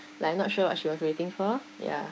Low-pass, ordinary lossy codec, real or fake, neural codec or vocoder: none; none; fake; codec, 16 kHz, 6 kbps, DAC